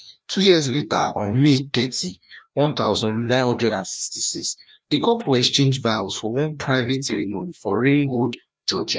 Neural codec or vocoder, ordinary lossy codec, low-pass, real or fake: codec, 16 kHz, 1 kbps, FreqCodec, larger model; none; none; fake